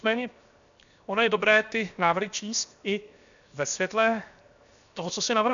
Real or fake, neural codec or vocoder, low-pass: fake; codec, 16 kHz, 0.7 kbps, FocalCodec; 7.2 kHz